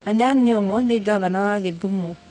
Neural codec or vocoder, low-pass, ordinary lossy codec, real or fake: codec, 24 kHz, 0.9 kbps, WavTokenizer, medium music audio release; 10.8 kHz; none; fake